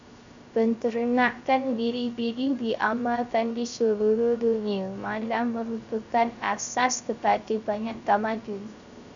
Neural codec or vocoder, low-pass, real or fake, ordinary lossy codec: codec, 16 kHz, 0.3 kbps, FocalCodec; 7.2 kHz; fake; MP3, 96 kbps